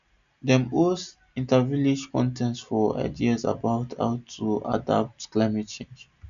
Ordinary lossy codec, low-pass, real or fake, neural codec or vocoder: none; 7.2 kHz; real; none